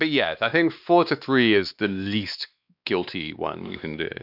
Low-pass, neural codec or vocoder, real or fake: 5.4 kHz; codec, 16 kHz, 2 kbps, X-Codec, WavLM features, trained on Multilingual LibriSpeech; fake